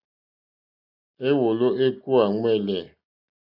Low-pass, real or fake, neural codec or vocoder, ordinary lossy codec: 5.4 kHz; real; none; AAC, 48 kbps